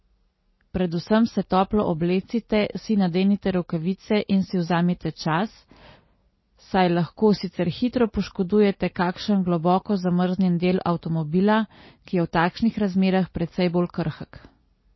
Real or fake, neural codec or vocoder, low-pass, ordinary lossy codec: real; none; 7.2 kHz; MP3, 24 kbps